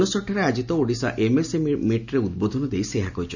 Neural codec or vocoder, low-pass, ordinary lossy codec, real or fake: none; 7.2 kHz; none; real